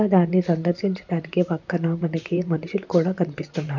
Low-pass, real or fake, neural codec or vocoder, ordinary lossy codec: 7.2 kHz; fake; vocoder, 44.1 kHz, 128 mel bands, Pupu-Vocoder; none